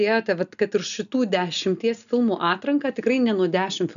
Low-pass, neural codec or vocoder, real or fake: 7.2 kHz; none; real